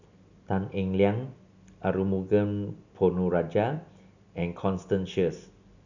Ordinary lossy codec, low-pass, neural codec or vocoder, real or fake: none; 7.2 kHz; none; real